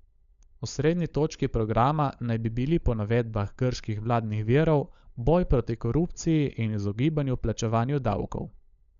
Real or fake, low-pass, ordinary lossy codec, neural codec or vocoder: fake; 7.2 kHz; none; codec, 16 kHz, 16 kbps, FunCodec, trained on LibriTTS, 50 frames a second